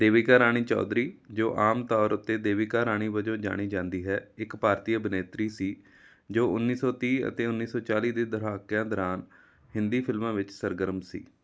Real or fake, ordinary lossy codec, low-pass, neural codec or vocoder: real; none; none; none